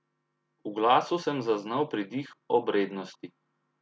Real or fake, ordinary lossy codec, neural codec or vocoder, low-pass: real; none; none; none